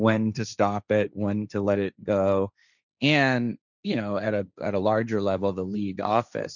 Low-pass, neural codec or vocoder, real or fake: 7.2 kHz; codec, 16 kHz, 1.1 kbps, Voila-Tokenizer; fake